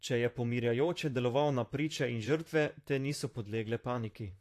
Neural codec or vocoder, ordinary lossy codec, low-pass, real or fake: vocoder, 44.1 kHz, 128 mel bands, Pupu-Vocoder; AAC, 64 kbps; 14.4 kHz; fake